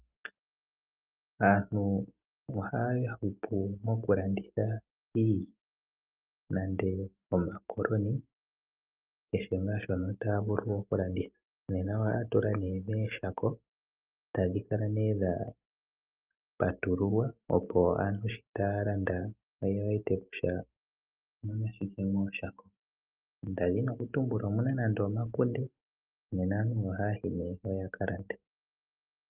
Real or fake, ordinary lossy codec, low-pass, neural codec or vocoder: real; Opus, 32 kbps; 3.6 kHz; none